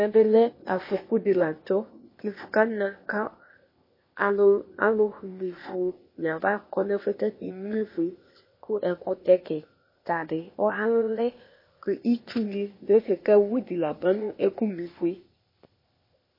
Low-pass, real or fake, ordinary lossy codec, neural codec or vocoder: 5.4 kHz; fake; MP3, 24 kbps; codec, 16 kHz, 0.8 kbps, ZipCodec